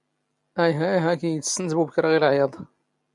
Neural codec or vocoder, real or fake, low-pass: none; real; 10.8 kHz